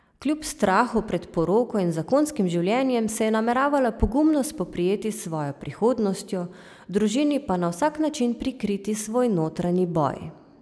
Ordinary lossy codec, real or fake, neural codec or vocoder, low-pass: none; real; none; none